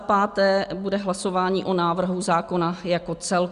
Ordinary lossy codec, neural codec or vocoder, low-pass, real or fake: Opus, 64 kbps; none; 10.8 kHz; real